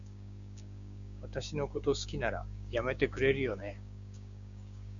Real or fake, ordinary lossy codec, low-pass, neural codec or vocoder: fake; MP3, 96 kbps; 7.2 kHz; codec, 16 kHz, 6 kbps, DAC